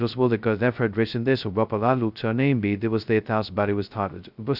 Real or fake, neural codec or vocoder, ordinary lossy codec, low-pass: fake; codec, 16 kHz, 0.2 kbps, FocalCodec; none; 5.4 kHz